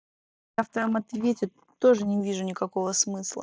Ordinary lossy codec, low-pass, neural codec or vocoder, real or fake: none; none; none; real